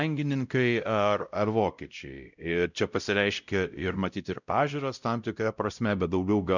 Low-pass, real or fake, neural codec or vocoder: 7.2 kHz; fake; codec, 16 kHz, 0.5 kbps, X-Codec, WavLM features, trained on Multilingual LibriSpeech